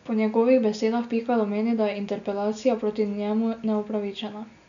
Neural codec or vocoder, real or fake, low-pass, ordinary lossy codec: none; real; 7.2 kHz; none